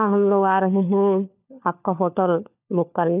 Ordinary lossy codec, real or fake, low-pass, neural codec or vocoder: none; fake; 3.6 kHz; codec, 16 kHz, 4 kbps, FunCodec, trained on LibriTTS, 50 frames a second